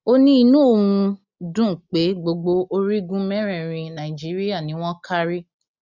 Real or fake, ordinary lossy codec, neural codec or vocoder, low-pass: real; none; none; 7.2 kHz